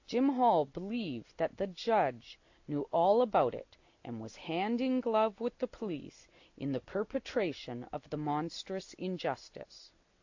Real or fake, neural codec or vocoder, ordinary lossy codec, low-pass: real; none; AAC, 48 kbps; 7.2 kHz